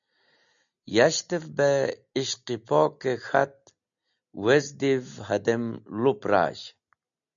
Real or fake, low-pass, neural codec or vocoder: real; 7.2 kHz; none